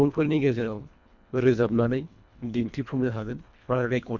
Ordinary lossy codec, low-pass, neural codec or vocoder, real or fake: none; 7.2 kHz; codec, 24 kHz, 1.5 kbps, HILCodec; fake